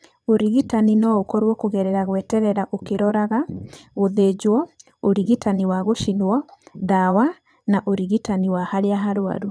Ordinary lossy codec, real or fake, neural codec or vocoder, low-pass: none; fake; vocoder, 22.05 kHz, 80 mel bands, Vocos; none